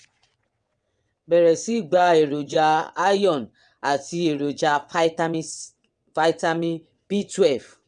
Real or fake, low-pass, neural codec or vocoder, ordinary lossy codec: fake; 9.9 kHz; vocoder, 22.05 kHz, 80 mel bands, WaveNeXt; none